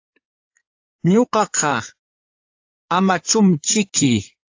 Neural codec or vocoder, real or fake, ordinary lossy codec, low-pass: codec, 16 kHz in and 24 kHz out, 2.2 kbps, FireRedTTS-2 codec; fake; AAC, 48 kbps; 7.2 kHz